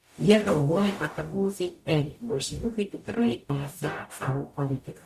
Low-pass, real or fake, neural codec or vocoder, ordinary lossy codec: 14.4 kHz; fake; codec, 44.1 kHz, 0.9 kbps, DAC; Opus, 64 kbps